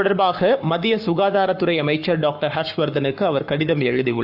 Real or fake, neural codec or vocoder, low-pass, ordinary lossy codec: fake; codec, 44.1 kHz, 7.8 kbps, Pupu-Codec; 5.4 kHz; AAC, 48 kbps